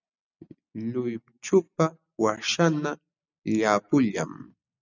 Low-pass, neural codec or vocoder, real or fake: 7.2 kHz; none; real